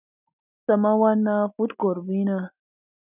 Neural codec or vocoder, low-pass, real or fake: none; 3.6 kHz; real